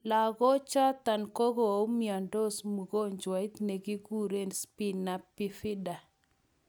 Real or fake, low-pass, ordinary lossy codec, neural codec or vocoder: real; none; none; none